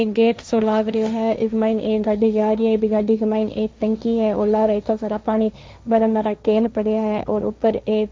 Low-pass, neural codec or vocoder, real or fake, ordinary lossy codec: none; codec, 16 kHz, 1.1 kbps, Voila-Tokenizer; fake; none